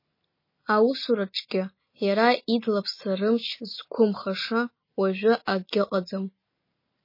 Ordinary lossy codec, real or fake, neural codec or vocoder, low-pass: MP3, 24 kbps; real; none; 5.4 kHz